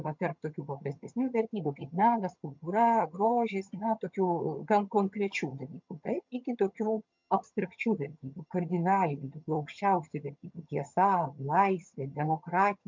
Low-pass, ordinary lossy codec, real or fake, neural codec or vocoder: 7.2 kHz; MP3, 64 kbps; fake; vocoder, 22.05 kHz, 80 mel bands, HiFi-GAN